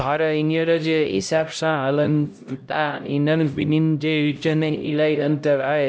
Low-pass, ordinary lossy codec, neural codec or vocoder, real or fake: none; none; codec, 16 kHz, 0.5 kbps, X-Codec, HuBERT features, trained on LibriSpeech; fake